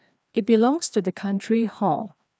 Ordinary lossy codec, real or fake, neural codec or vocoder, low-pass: none; fake; codec, 16 kHz, 2 kbps, FreqCodec, larger model; none